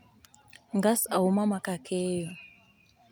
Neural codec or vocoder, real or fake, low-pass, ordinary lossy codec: none; real; none; none